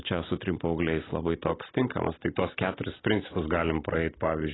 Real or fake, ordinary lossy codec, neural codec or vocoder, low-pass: real; AAC, 16 kbps; none; 7.2 kHz